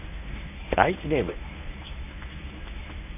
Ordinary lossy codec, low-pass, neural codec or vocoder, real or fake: none; 3.6 kHz; codec, 24 kHz, 0.9 kbps, WavTokenizer, medium speech release version 1; fake